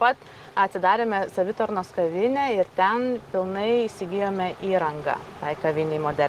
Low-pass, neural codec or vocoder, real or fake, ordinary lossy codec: 14.4 kHz; none; real; Opus, 16 kbps